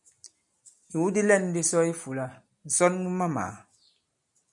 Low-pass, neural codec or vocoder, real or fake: 10.8 kHz; none; real